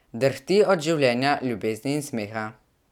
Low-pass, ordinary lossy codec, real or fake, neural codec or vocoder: 19.8 kHz; none; real; none